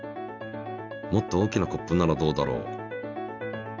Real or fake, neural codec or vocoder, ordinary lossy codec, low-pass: real; none; none; 7.2 kHz